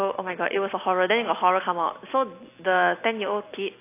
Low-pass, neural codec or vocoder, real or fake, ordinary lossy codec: 3.6 kHz; none; real; AAC, 24 kbps